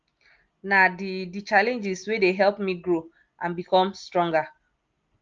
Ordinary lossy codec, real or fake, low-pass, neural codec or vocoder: Opus, 24 kbps; real; 7.2 kHz; none